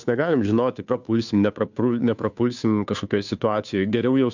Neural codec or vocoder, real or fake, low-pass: codec, 16 kHz, 2 kbps, FunCodec, trained on Chinese and English, 25 frames a second; fake; 7.2 kHz